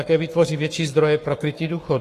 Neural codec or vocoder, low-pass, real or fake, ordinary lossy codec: codec, 44.1 kHz, 7.8 kbps, Pupu-Codec; 14.4 kHz; fake; AAC, 48 kbps